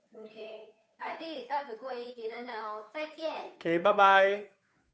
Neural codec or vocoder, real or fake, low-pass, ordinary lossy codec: codec, 16 kHz, 2 kbps, FunCodec, trained on Chinese and English, 25 frames a second; fake; none; none